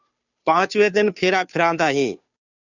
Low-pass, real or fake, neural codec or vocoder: 7.2 kHz; fake; codec, 16 kHz, 2 kbps, FunCodec, trained on Chinese and English, 25 frames a second